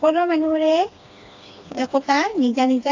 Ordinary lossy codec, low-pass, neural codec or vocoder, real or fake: AAC, 48 kbps; 7.2 kHz; codec, 16 kHz, 2 kbps, FreqCodec, smaller model; fake